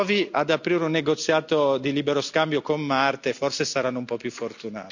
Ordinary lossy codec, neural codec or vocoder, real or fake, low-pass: none; none; real; 7.2 kHz